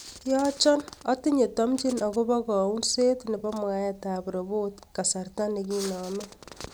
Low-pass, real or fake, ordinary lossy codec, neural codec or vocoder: none; real; none; none